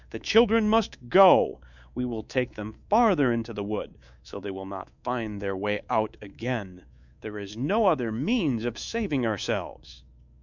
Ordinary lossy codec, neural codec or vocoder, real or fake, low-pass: MP3, 64 kbps; codec, 24 kHz, 3.1 kbps, DualCodec; fake; 7.2 kHz